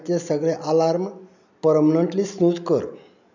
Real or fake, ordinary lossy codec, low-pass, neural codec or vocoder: real; none; 7.2 kHz; none